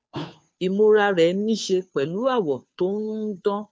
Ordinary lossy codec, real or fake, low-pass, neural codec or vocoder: none; fake; none; codec, 16 kHz, 2 kbps, FunCodec, trained on Chinese and English, 25 frames a second